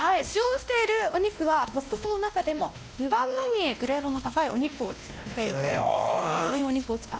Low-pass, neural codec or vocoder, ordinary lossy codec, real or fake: none; codec, 16 kHz, 1 kbps, X-Codec, WavLM features, trained on Multilingual LibriSpeech; none; fake